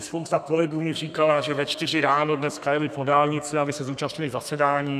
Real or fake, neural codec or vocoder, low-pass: fake; codec, 32 kHz, 1.9 kbps, SNAC; 14.4 kHz